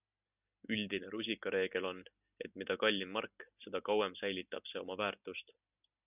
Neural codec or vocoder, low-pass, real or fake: none; 3.6 kHz; real